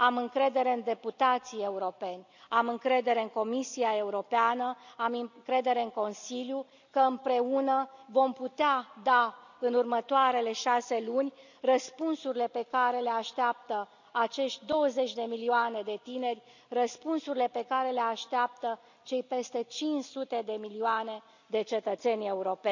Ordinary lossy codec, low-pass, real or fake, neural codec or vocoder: none; 7.2 kHz; real; none